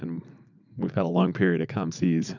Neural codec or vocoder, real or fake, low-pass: vocoder, 44.1 kHz, 80 mel bands, Vocos; fake; 7.2 kHz